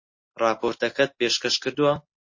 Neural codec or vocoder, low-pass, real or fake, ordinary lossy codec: none; 7.2 kHz; real; MP3, 32 kbps